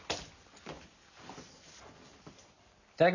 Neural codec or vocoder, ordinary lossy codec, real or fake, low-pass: none; none; real; 7.2 kHz